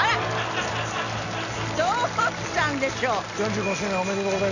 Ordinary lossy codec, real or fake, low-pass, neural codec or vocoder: none; real; 7.2 kHz; none